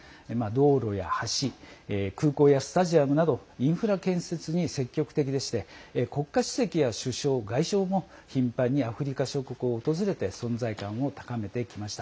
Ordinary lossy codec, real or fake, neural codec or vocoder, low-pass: none; real; none; none